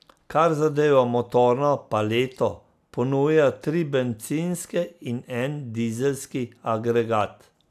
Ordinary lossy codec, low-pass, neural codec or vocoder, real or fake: AAC, 96 kbps; 14.4 kHz; none; real